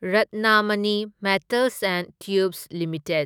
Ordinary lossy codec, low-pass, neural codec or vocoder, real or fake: none; none; autoencoder, 48 kHz, 128 numbers a frame, DAC-VAE, trained on Japanese speech; fake